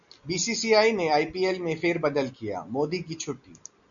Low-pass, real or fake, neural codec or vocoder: 7.2 kHz; real; none